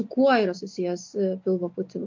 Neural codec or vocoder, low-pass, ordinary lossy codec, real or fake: none; 7.2 kHz; MP3, 48 kbps; real